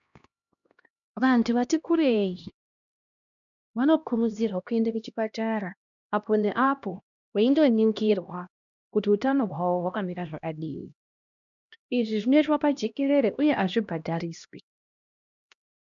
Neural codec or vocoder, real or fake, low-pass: codec, 16 kHz, 1 kbps, X-Codec, HuBERT features, trained on LibriSpeech; fake; 7.2 kHz